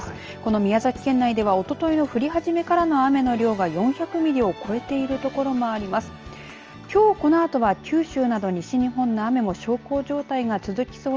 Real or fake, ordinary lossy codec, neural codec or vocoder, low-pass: real; Opus, 24 kbps; none; 7.2 kHz